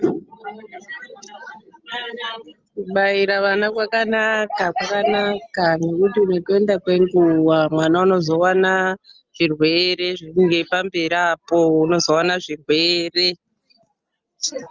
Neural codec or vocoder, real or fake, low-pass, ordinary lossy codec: none; real; 7.2 kHz; Opus, 24 kbps